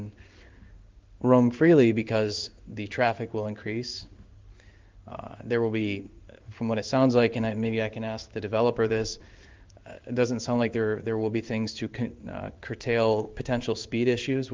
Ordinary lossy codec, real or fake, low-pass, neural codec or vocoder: Opus, 32 kbps; fake; 7.2 kHz; codec, 16 kHz in and 24 kHz out, 1 kbps, XY-Tokenizer